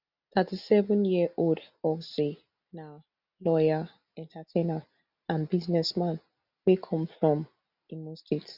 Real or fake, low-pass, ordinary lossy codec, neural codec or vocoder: real; 5.4 kHz; none; none